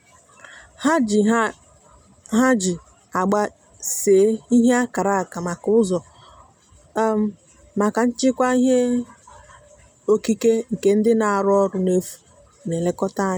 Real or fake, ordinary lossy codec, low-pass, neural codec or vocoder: real; none; none; none